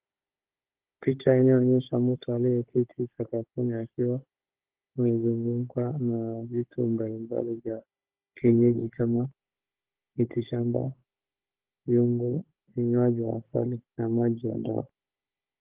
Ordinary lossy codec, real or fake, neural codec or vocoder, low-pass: Opus, 16 kbps; fake; codec, 16 kHz, 16 kbps, FunCodec, trained on Chinese and English, 50 frames a second; 3.6 kHz